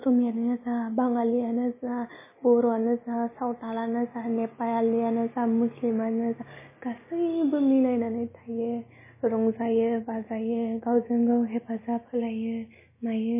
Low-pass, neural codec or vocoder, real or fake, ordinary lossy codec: 3.6 kHz; none; real; MP3, 16 kbps